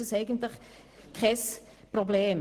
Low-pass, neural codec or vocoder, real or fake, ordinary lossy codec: 14.4 kHz; none; real; Opus, 16 kbps